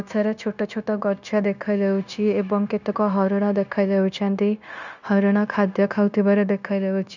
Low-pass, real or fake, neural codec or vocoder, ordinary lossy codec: 7.2 kHz; fake; codec, 16 kHz, 0.9 kbps, LongCat-Audio-Codec; none